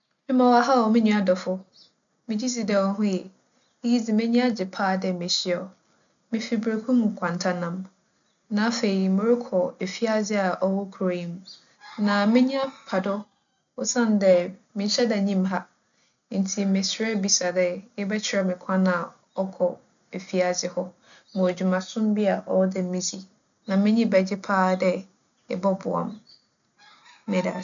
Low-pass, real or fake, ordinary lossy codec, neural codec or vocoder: 7.2 kHz; real; none; none